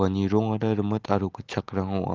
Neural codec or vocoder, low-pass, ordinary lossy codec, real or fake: none; 7.2 kHz; Opus, 16 kbps; real